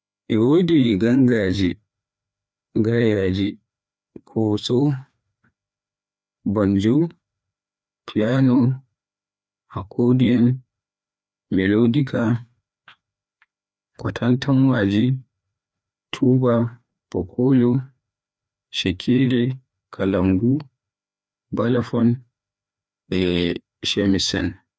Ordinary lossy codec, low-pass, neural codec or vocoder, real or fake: none; none; codec, 16 kHz, 2 kbps, FreqCodec, larger model; fake